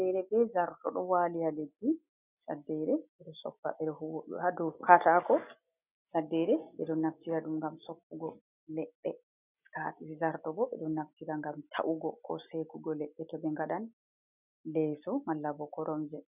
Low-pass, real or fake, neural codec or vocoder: 3.6 kHz; real; none